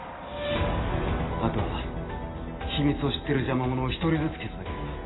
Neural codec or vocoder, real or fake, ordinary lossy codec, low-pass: none; real; AAC, 16 kbps; 7.2 kHz